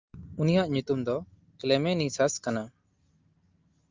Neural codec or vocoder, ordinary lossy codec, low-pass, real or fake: none; Opus, 32 kbps; 7.2 kHz; real